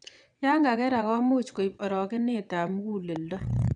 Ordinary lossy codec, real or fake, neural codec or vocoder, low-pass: none; fake; vocoder, 22.05 kHz, 80 mel bands, WaveNeXt; 9.9 kHz